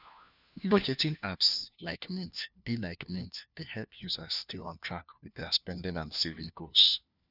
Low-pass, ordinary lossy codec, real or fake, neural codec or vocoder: 5.4 kHz; none; fake; codec, 16 kHz, 1 kbps, FunCodec, trained on LibriTTS, 50 frames a second